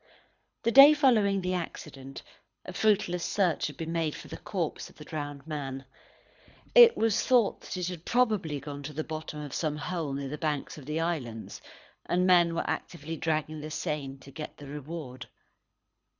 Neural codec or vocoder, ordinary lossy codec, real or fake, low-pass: codec, 24 kHz, 6 kbps, HILCodec; Opus, 64 kbps; fake; 7.2 kHz